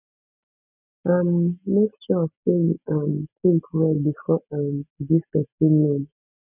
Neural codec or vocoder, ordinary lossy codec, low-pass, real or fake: none; none; 3.6 kHz; real